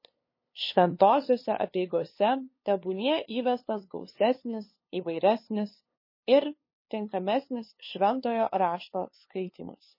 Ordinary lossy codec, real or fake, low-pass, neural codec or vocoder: MP3, 24 kbps; fake; 5.4 kHz; codec, 16 kHz, 2 kbps, FunCodec, trained on LibriTTS, 25 frames a second